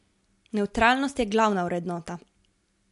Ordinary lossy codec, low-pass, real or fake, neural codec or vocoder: MP3, 64 kbps; 10.8 kHz; real; none